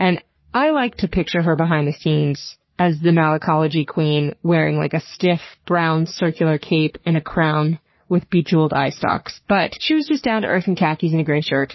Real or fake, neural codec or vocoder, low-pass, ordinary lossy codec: fake; codec, 44.1 kHz, 3.4 kbps, Pupu-Codec; 7.2 kHz; MP3, 24 kbps